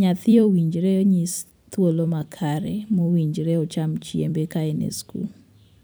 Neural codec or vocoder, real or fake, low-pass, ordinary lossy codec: vocoder, 44.1 kHz, 128 mel bands every 256 samples, BigVGAN v2; fake; none; none